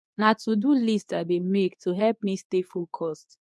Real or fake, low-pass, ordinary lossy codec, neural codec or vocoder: fake; none; none; codec, 24 kHz, 0.9 kbps, WavTokenizer, medium speech release version 2